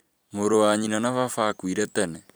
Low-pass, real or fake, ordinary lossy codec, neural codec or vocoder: none; fake; none; vocoder, 44.1 kHz, 128 mel bands every 256 samples, BigVGAN v2